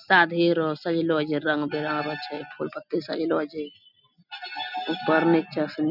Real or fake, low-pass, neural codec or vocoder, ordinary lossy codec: real; 5.4 kHz; none; none